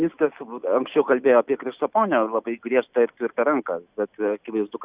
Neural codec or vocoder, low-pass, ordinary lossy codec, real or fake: none; 3.6 kHz; Opus, 64 kbps; real